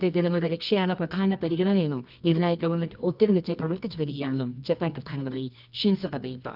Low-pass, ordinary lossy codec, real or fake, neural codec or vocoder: 5.4 kHz; none; fake; codec, 24 kHz, 0.9 kbps, WavTokenizer, medium music audio release